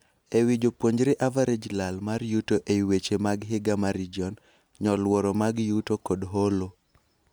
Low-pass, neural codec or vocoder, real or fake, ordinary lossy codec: none; none; real; none